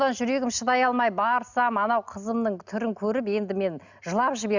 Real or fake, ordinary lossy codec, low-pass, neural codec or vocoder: real; none; 7.2 kHz; none